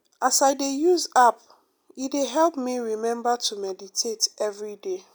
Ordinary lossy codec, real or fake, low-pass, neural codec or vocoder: none; real; none; none